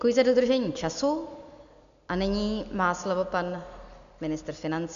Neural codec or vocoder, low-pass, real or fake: none; 7.2 kHz; real